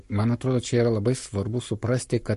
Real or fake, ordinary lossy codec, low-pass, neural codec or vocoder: fake; MP3, 48 kbps; 14.4 kHz; codec, 44.1 kHz, 7.8 kbps, Pupu-Codec